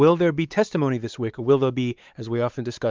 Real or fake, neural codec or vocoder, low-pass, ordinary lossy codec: fake; codec, 16 kHz, 4 kbps, X-Codec, WavLM features, trained on Multilingual LibriSpeech; 7.2 kHz; Opus, 24 kbps